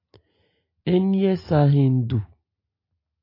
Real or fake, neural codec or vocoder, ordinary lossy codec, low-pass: real; none; AAC, 32 kbps; 5.4 kHz